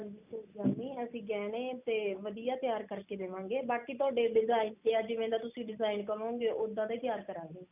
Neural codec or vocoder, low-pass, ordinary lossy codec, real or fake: none; 3.6 kHz; none; real